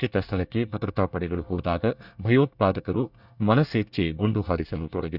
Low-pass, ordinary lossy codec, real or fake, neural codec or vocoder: 5.4 kHz; none; fake; codec, 24 kHz, 1 kbps, SNAC